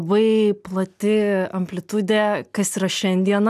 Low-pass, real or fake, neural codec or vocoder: 14.4 kHz; real; none